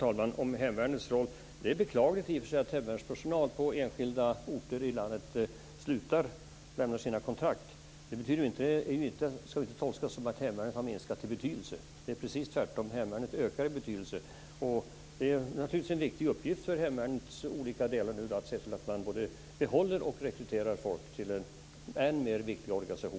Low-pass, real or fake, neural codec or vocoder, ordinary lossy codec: none; real; none; none